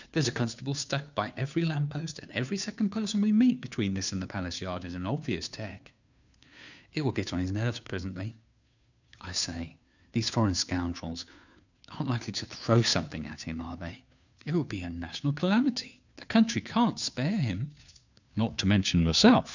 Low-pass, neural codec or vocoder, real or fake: 7.2 kHz; codec, 16 kHz, 2 kbps, FunCodec, trained on Chinese and English, 25 frames a second; fake